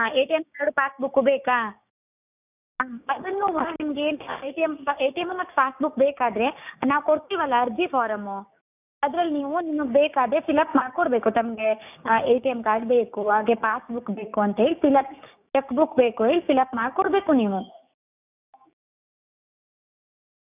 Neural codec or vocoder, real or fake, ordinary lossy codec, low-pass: codec, 16 kHz, 6 kbps, DAC; fake; none; 3.6 kHz